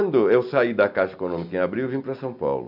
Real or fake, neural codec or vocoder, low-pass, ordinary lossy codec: real; none; 5.4 kHz; none